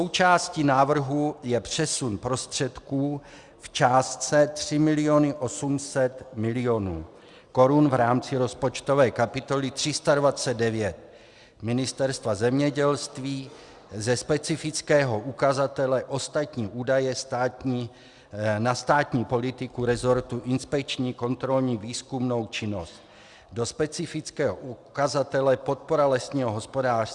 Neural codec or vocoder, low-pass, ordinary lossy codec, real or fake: none; 10.8 kHz; Opus, 64 kbps; real